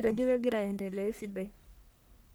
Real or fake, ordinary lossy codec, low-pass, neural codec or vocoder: fake; none; none; codec, 44.1 kHz, 1.7 kbps, Pupu-Codec